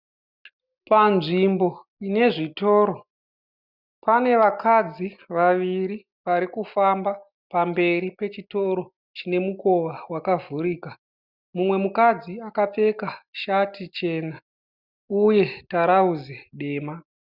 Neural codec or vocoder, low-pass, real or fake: none; 5.4 kHz; real